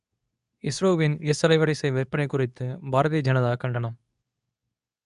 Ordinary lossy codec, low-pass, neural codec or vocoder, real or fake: none; 10.8 kHz; codec, 24 kHz, 0.9 kbps, WavTokenizer, medium speech release version 2; fake